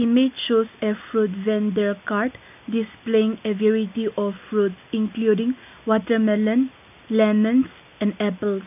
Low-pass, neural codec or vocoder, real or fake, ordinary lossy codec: 3.6 kHz; none; real; none